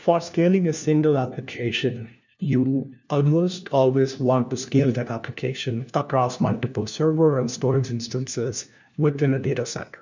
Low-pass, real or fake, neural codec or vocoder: 7.2 kHz; fake; codec, 16 kHz, 1 kbps, FunCodec, trained on LibriTTS, 50 frames a second